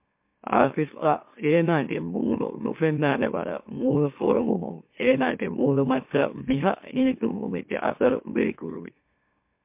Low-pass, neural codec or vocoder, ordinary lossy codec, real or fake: 3.6 kHz; autoencoder, 44.1 kHz, a latent of 192 numbers a frame, MeloTTS; MP3, 32 kbps; fake